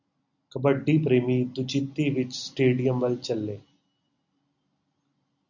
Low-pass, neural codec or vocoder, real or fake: 7.2 kHz; none; real